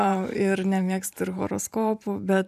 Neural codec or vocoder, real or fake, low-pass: vocoder, 44.1 kHz, 128 mel bands every 512 samples, BigVGAN v2; fake; 14.4 kHz